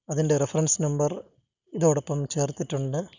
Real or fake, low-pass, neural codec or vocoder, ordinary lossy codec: real; 7.2 kHz; none; none